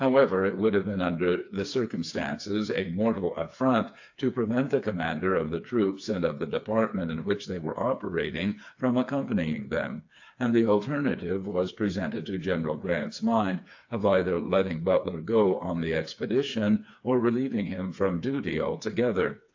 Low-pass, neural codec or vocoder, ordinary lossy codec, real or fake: 7.2 kHz; codec, 16 kHz, 4 kbps, FreqCodec, smaller model; AAC, 48 kbps; fake